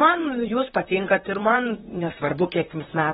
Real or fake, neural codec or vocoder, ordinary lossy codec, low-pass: fake; vocoder, 44.1 kHz, 128 mel bands, Pupu-Vocoder; AAC, 16 kbps; 19.8 kHz